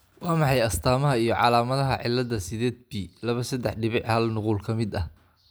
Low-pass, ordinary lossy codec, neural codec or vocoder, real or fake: none; none; none; real